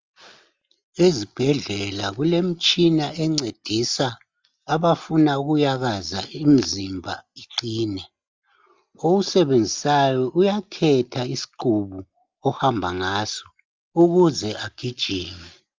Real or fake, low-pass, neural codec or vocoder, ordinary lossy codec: real; 7.2 kHz; none; Opus, 32 kbps